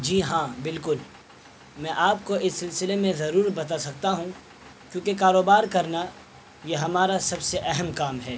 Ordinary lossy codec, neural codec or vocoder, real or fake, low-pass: none; none; real; none